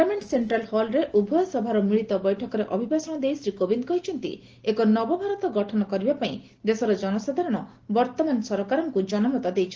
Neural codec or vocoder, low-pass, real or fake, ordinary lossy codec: none; 7.2 kHz; real; Opus, 16 kbps